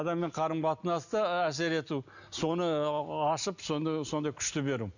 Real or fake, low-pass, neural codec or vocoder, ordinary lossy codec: real; 7.2 kHz; none; none